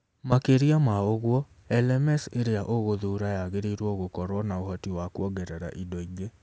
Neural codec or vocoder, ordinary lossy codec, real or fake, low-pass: none; none; real; none